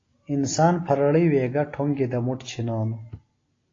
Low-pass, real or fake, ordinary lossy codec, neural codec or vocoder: 7.2 kHz; real; AAC, 32 kbps; none